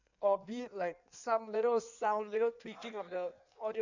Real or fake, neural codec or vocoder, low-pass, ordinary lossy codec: fake; codec, 16 kHz in and 24 kHz out, 1.1 kbps, FireRedTTS-2 codec; 7.2 kHz; none